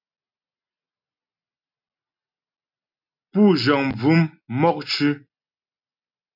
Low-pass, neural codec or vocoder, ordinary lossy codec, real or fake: 5.4 kHz; none; MP3, 48 kbps; real